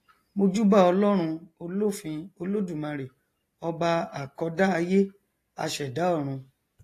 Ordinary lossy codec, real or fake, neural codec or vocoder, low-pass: AAC, 48 kbps; real; none; 14.4 kHz